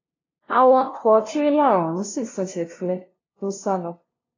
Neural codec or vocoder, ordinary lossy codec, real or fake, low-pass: codec, 16 kHz, 0.5 kbps, FunCodec, trained on LibriTTS, 25 frames a second; AAC, 32 kbps; fake; 7.2 kHz